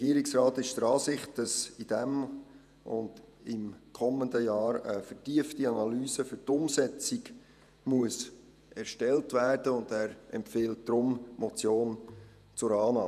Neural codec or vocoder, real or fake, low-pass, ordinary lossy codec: vocoder, 48 kHz, 128 mel bands, Vocos; fake; 14.4 kHz; none